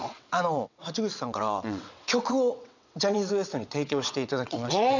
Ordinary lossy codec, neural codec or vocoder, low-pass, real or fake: none; vocoder, 22.05 kHz, 80 mel bands, WaveNeXt; 7.2 kHz; fake